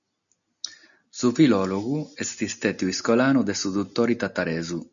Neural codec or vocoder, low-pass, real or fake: none; 7.2 kHz; real